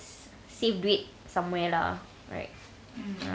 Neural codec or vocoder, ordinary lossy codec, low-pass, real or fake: none; none; none; real